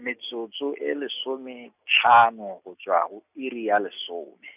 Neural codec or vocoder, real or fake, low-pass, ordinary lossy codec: none; real; 3.6 kHz; none